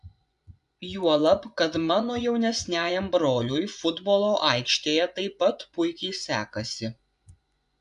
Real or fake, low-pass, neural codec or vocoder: fake; 10.8 kHz; vocoder, 24 kHz, 100 mel bands, Vocos